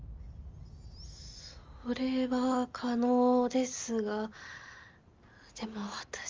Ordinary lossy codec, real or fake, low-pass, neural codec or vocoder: Opus, 32 kbps; real; 7.2 kHz; none